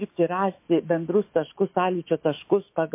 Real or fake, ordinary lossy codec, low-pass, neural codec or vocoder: fake; MP3, 24 kbps; 3.6 kHz; autoencoder, 48 kHz, 128 numbers a frame, DAC-VAE, trained on Japanese speech